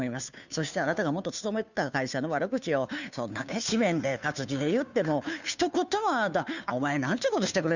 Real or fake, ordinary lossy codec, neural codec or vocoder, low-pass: fake; none; codec, 16 kHz, 2 kbps, FunCodec, trained on Chinese and English, 25 frames a second; 7.2 kHz